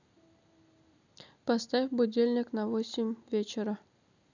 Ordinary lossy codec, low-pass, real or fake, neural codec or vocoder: none; 7.2 kHz; real; none